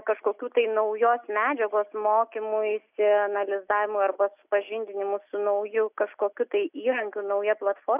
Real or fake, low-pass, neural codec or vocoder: real; 3.6 kHz; none